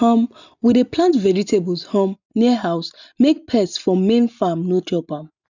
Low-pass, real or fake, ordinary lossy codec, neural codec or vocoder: 7.2 kHz; real; none; none